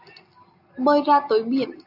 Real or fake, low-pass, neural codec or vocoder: real; 5.4 kHz; none